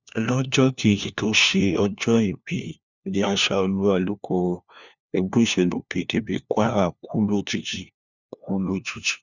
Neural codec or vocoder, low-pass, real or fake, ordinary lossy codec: codec, 16 kHz, 1 kbps, FunCodec, trained on LibriTTS, 50 frames a second; 7.2 kHz; fake; none